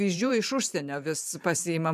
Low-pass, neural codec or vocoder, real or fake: 14.4 kHz; vocoder, 44.1 kHz, 128 mel bands every 512 samples, BigVGAN v2; fake